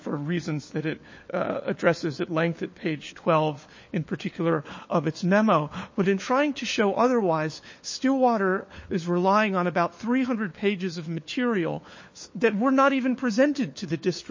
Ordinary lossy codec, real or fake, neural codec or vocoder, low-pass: MP3, 32 kbps; fake; codec, 16 kHz, 4 kbps, FunCodec, trained on LibriTTS, 50 frames a second; 7.2 kHz